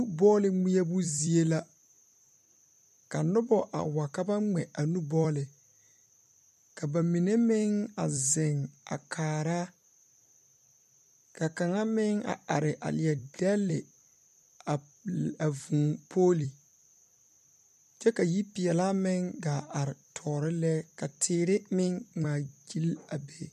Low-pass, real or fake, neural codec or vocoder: 14.4 kHz; fake; vocoder, 44.1 kHz, 128 mel bands every 256 samples, BigVGAN v2